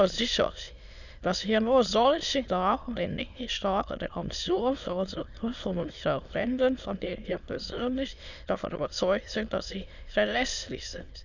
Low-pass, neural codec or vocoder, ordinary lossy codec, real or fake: 7.2 kHz; autoencoder, 22.05 kHz, a latent of 192 numbers a frame, VITS, trained on many speakers; none; fake